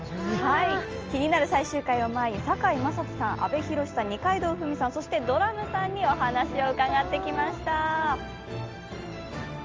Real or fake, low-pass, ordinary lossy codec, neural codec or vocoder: real; 7.2 kHz; Opus, 24 kbps; none